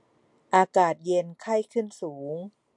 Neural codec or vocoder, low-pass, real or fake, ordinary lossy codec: none; 10.8 kHz; real; MP3, 64 kbps